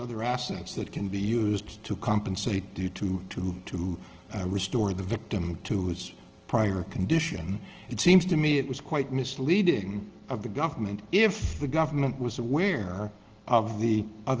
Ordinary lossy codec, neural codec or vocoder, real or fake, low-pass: Opus, 16 kbps; none; real; 7.2 kHz